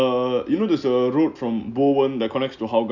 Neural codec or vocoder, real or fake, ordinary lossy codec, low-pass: none; real; none; 7.2 kHz